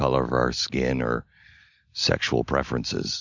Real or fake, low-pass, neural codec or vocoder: real; 7.2 kHz; none